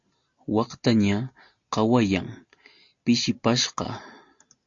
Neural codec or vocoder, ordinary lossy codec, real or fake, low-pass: none; AAC, 48 kbps; real; 7.2 kHz